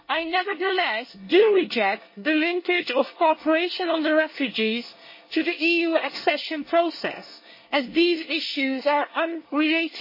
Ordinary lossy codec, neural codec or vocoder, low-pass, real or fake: MP3, 24 kbps; codec, 24 kHz, 1 kbps, SNAC; 5.4 kHz; fake